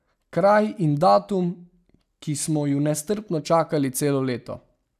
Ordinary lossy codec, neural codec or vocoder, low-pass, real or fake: none; none; 14.4 kHz; real